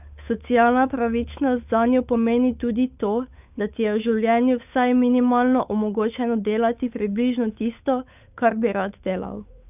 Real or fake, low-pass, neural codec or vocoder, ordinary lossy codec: fake; 3.6 kHz; codec, 16 kHz, 8 kbps, FunCodec, trained on Chinese and English, 25 frames a second; none